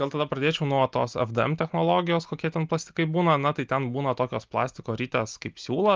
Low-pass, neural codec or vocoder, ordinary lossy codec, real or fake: 7.2 kHz; none; Opus, 24 kbps; real